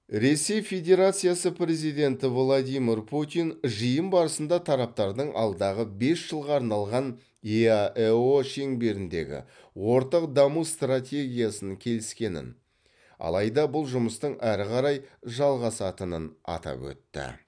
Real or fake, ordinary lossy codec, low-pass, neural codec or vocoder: real; none; 9.9 kHz; none